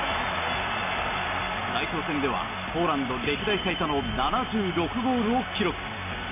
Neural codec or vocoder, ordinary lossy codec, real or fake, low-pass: none; AAC, 32 kbps; real; 3.6 kHz